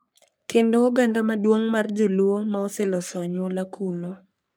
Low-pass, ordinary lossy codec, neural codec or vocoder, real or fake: none; none; codec, 44.1 kHz, 3.4 kbps, Pupu-Codec; fake